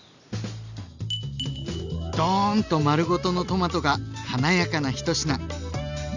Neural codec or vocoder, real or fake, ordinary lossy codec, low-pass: none; real; none; 7.2 kHz